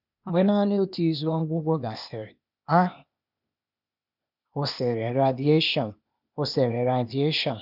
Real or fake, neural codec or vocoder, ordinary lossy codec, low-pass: fake; codec, 16 kHz, 0.8 kbps, ZipCodec; none; 5.4 kHz